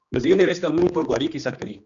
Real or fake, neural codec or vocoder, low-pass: fake; codec, 16 kHz, 2 kbps, X-Codec, HuBERT features, trained on general audio; 7.2 kHz